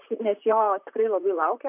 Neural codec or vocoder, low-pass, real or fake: vocoder, 44.1 kHz, 128 mel bands, Pupu-Vocoder; 3.6 kHz; fake